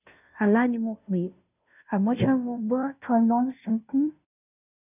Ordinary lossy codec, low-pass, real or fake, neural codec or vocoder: none; 3.6 kHz; fake; codec, 16 kHz, 0.5 kbps, FunCodec, trained on Chinese and English, 25 frames a second